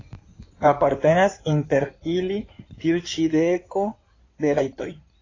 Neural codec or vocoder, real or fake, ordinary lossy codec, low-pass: codec, 16 kHz in and 24 kHz out, 2.2 kbps, FireRedTTS-2 codec; fake; AAC, 32 kbps; 7.2 kHz